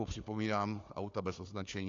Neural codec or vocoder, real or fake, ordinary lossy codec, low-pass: codec, 16 kHz, 4 kbps, FunCodec, trained on Chinese and English, 50 frames a second; fake; AAC, 48 kbps; 7.2 kHz